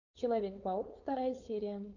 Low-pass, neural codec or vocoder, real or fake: 7.2 kHz; codec, 16 kHz, 4.8 kbps, FACodec; fake